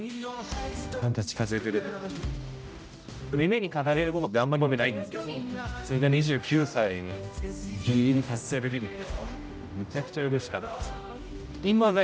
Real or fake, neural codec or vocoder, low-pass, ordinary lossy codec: fake; codec, 16 kHz, 0.5 kbps, X-Codec, HuBERT features, trained on general audio; none; none